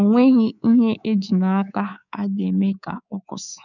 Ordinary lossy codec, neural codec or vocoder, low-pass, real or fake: none; codec, 24 kHz, 3.1 kbps, DualCodec; 7.2 kHz; fake